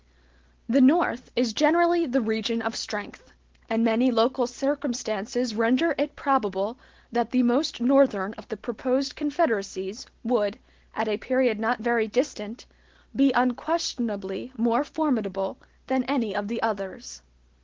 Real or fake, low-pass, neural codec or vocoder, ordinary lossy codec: real; 7.2 kHz; none; Opus, 16 kbps